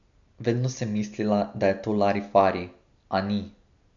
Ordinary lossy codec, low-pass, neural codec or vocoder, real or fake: none; 7.2 kHz; none; real